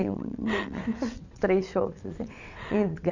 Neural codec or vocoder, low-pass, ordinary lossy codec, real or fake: vocoder, 22.05 kHz, 80 mel bands, WaveNeXt; 7.2 kHz; none; fake